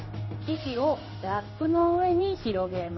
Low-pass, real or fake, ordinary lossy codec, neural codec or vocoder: 7.2 kHz; fake; MP3, 24 kbps; codec, 16 kHz in and 24 kHz out, 1 kbps, XY-Tokenizer